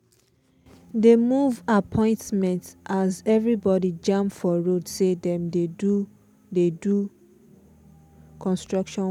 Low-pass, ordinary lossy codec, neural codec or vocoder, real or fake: 19.8 kHz; none; none; real